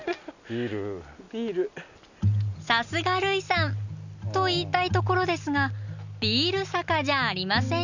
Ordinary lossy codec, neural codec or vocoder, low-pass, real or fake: none; none; 7.2 kHz; real